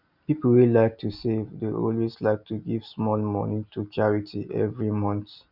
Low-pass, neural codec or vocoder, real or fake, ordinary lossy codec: 5.4 kHz; none; real; none